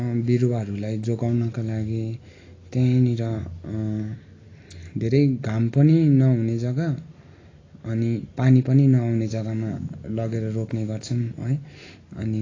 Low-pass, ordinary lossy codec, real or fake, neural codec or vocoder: 7.2 kHz; AAC, 32 kbps; real; none